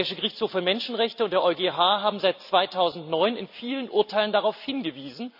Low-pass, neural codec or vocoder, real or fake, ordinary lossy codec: 5.4 kHz; none; real; AAC, 48 kbps